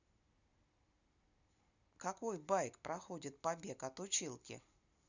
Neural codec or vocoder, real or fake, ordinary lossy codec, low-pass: none; real; none; 7.2 kHz